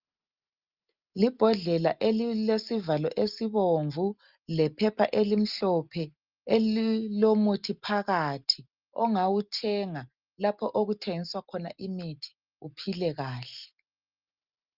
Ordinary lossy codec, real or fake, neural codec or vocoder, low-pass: Opus, 24 kbps; real; none; 5.4 kHz